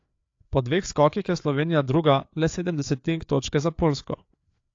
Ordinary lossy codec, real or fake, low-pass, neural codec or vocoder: AAC, 48 kbps; fake; 7.2 kHz; codec, 16 kHz, 4 kbps, FreqCodec, larger model